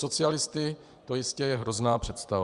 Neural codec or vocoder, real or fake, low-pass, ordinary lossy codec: vocoder, 24 kHz, 100 mel bands, Vocos; fake; 10.8 kHz; Opus, 64 kbps